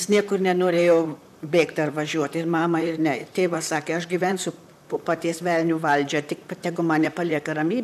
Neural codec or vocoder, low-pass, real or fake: vocoder, 44.1 kHz, 128 mel bands, Pupu-Vocoder; 14.4 kHz; fake